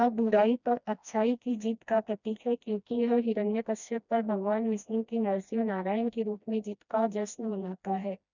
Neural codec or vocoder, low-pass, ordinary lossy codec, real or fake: codec, 16 kHz, 1 kbps, FreqCodec, smaller model; 7.2 kHz; none; fake